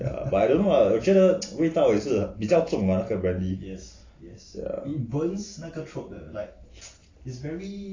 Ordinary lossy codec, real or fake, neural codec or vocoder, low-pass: AAC, 32 kbps; real; none; 7.2 kHz